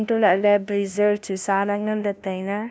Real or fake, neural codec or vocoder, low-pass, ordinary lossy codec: fake; codec, 16 kHz, 0.5 kbps, FunCodec, trained on LibriTTS, 25 frames a second; none; none